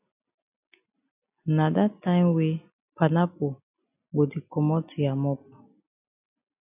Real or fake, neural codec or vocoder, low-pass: real; none; 3.6 kHz